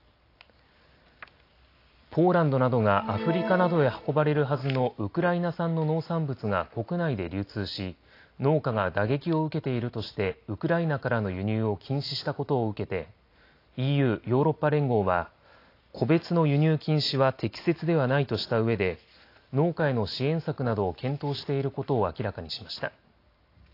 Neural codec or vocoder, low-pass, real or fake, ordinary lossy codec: none; 5.4 kHz; real; AAC, 32 kbps